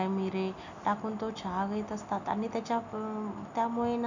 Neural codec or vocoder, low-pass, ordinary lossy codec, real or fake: none; 7.2 kHz; none; real